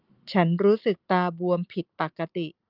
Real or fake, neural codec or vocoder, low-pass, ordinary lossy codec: real; none; 5.4 kHz; Opus, 32 kbps